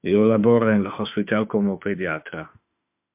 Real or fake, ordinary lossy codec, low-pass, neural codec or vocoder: fake; MP3, 32 kbps; 3.6 kHz; autoencoder, 48 kHz, 32 numbers a frame, DAC-VAE, trained on Japanese speech